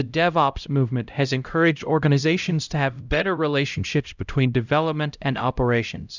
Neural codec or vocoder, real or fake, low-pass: codec, 16 kHz, 0.5 kbps, X-Codec, HuBERT features, trained on LibriSpeech; fake; 7.2 kHz